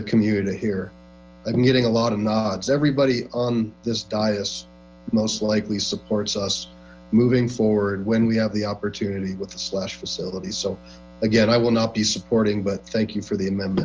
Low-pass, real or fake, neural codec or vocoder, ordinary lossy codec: 7.2 kHz; real; none; Opus, 24 kbps